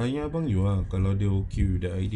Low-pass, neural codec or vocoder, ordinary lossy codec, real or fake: 10.8 kHz; none; none; real